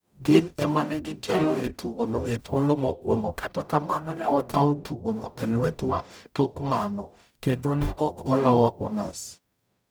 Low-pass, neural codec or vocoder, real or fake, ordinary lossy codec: none; codec, 44.1 kHz, 0.9 kbps, DAC; fake; none